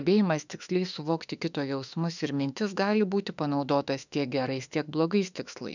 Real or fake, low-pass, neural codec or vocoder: fake; 7.2 kHz; autoencoder, 48 kHz, 32 numbers a frame, DAC-VAE, trained on Japanese speech